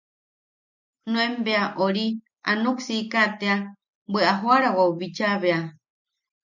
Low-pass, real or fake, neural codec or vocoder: 7.2 kHz; real; none